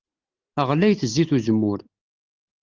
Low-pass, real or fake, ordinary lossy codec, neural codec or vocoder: 7.2 kHz; real; Opus, 24 kbps; none